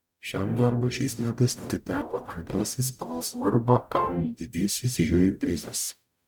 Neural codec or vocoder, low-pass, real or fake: codec, 44.1 kHz, 0.9 kbps, DAC; 19.8 kHz; fake